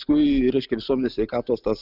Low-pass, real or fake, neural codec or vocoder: 5.4 kHz; real; none